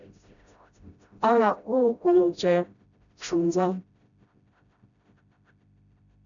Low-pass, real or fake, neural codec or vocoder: 7.2 kHz; fake; codec, 16 kHz, 0.5 kbps, FreqCodec, smaller model